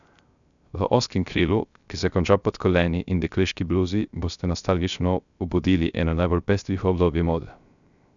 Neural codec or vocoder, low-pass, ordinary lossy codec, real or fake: codec, 16 kHz, 0.3 kbps, FocalCodec; 7.2 kHz; none; fake